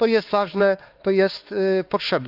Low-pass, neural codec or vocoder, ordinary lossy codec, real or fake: 5.4 kHz; codec, 16 kHz, 4 kbps, X-Codec, HuBERT features, trained on LibriSpeech; Opus, 32 kbps; fake